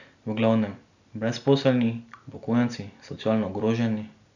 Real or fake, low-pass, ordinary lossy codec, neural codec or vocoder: real; 7.2 kHz; none; none